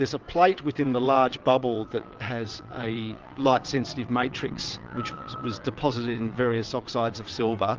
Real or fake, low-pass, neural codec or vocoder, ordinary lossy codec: fake; 7.2 kHz; vocoder, 22.05 kHz, 80 mel bands, WaveNeXt; Opus, 32 kbps